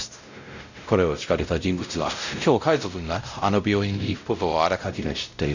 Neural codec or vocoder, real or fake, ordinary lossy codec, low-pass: codec, 16 kHz, 0.5 kbps, X-Codec, WavLM features, trained on Multilingual LibriSpeech; fake; none; 7.2 kHz